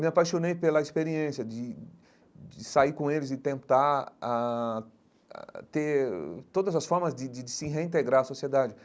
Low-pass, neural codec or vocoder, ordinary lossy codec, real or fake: none; none; none; real